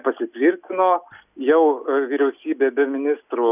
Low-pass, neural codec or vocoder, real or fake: 3.6 kHz; none; real